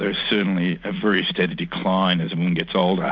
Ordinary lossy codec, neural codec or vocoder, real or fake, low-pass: MP3, 64 kbps; none; real; 7.2 kHz